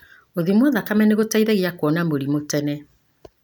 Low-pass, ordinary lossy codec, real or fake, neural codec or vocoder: none; none; real; none